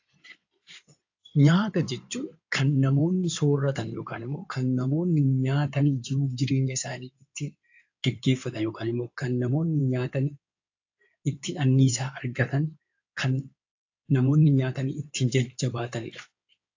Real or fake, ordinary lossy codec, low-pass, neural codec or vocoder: fake; AAC, 48 kbps; 7.2 kHz; codec, 16 kHz in and 24 kHz out, 2.2 kbps, FireRedTTS-2 codec